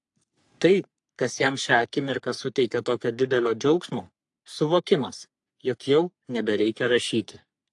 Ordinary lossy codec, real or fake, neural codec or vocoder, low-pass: AAC, 64 kbps; fake; codec, 44.1 kHz, 3.4 kbps, Pupu-Codec; 10.8 kHz